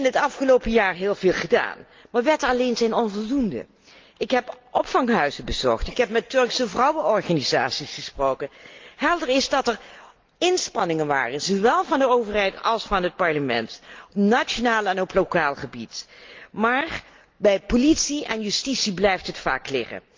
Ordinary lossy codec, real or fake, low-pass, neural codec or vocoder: Opus, 24 kbps; real; 7.2 kHz; none